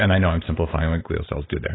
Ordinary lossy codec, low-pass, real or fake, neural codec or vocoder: AAC, 16 kbps; 7.2 kHz; real; none